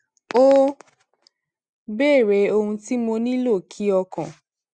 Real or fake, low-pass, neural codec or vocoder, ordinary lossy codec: real; 9.9 kHz; none; Opus, 64 kbps